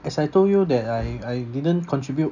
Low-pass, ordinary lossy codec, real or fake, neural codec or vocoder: 7.2 kHz; none; real; none